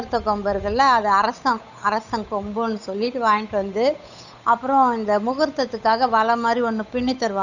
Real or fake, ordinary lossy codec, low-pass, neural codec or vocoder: fake; none; 7.2 kHz; codec, 16 kHz, 8 kbps, FunCodec, trained on Chinese and English, 25 frames a second